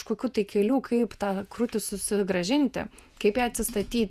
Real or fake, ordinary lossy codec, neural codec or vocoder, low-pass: fake; Opus, 64 kbps; vocoder, 48 kHz, 128 mel bands, Vocos; 14.4 kHz